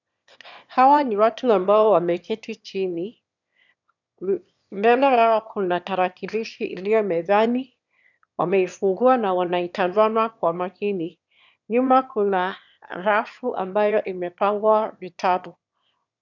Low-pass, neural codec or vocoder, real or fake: 7.2 kHz; autoencoder, 22.05 kHz, a latent of 192 numbers a frame, VITS, trained on one speaker; fake